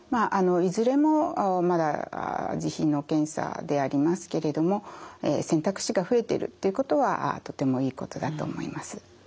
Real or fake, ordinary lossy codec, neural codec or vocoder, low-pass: real; none; none; none